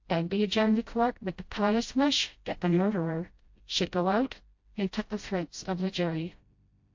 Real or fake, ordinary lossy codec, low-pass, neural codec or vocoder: fake; MP3, 48 kbps; 7.2 kHz; codec, 16 kHz, 0.5 kbps, FreqCodec, smaller model